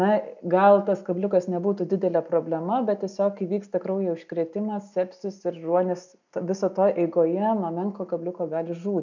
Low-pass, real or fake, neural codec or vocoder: 7.2 kHz; real; none